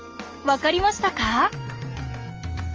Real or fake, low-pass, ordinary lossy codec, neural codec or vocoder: real; 7.2 kHz; Opus, 24 kbps; none